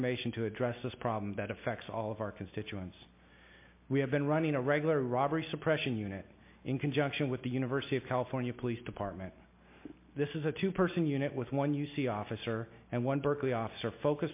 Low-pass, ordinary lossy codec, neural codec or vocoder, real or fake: 3.6 kHz; MP3, 24 kbps; none; real